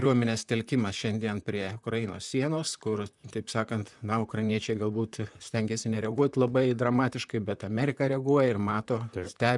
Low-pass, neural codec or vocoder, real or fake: 10.8 kHz; vocoder, 44.1 kHz, 128 mel bands, Pupu-Vocoder; fake